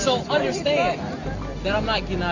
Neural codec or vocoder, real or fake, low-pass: none; real; 7.2 kHz